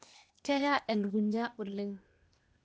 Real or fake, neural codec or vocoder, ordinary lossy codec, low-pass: fake; codec, 16 kHz, 0.8 kbps, ZipCodec; none; none